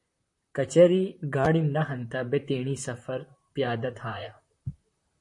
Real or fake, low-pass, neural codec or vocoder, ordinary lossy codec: fake; 10.8 kHz; vocoder, 44.1 kHz, 128 mel bands, Pupu-Vocoder; MP3, 48 kbps